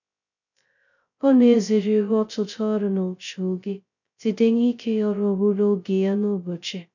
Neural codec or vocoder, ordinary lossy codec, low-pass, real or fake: codec, 16 kHz, 0.2 kbps, FocalCodec; none; 7.2 kHz; fake